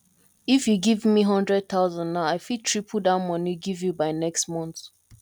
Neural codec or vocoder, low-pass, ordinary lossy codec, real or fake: none; 19.8 kHz; none; real